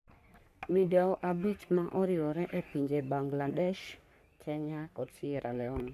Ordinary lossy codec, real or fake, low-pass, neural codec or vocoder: none; fake; 14.4 kHz; codec, 44.1 kHz, 3.4 kbps, Pupu-Codec